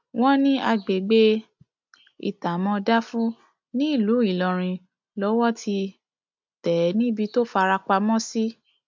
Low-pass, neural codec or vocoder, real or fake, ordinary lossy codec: 7.2 kHz; none; real; MP3, 64 kbps